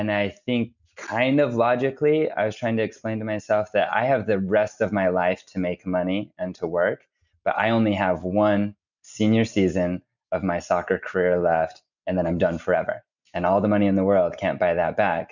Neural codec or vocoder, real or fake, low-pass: none; real; 7.2 kHz